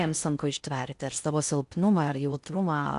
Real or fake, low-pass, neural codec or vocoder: fake; 10.8 kHz; codec, 16 kHz in and 24 kHz out, 0.6 kbps, FocalCodec, streaming, 4096 codes